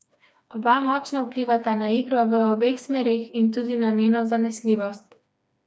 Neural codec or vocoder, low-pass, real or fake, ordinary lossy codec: codec, 16 kHz, 2 kbps, FreqCodec, smaller model; none; fake; none